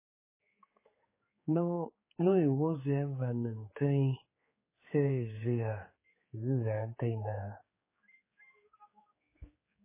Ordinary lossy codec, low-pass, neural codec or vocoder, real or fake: MP3, 16 kbps; 3.6 kHz; codec, 16 kHz, 4 kbps, X-Codec, HuBERT features, trained on general audio; fake